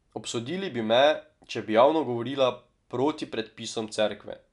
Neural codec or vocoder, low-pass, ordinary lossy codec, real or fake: none; 10.8 kHz; none; real